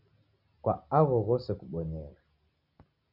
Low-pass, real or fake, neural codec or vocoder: 5.4 kHz; real; none